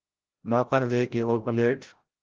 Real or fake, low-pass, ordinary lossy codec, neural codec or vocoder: fake; 7.2 kHz; Opus, 16 kbps; codec, 16 kHz, 0.5 kbps, FreqCodec, larger model